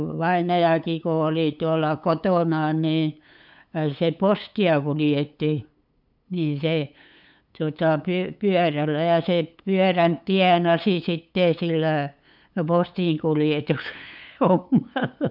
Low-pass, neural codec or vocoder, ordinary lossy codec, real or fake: 5.4 kHz; codec, 16 kHz, 8 kbps, FunCodec, trained on LibriTTS, 25 frames a second; none; fake